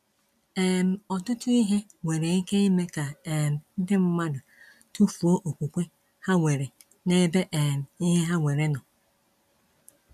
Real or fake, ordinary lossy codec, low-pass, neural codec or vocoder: real; none; 14.4 kHz; none